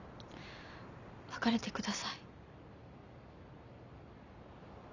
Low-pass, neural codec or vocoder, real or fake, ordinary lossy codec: 7.2 kHz; vocoder, 44.1 kHz, 128 mel bands every 256 samples, BigVGAN v2; fake; none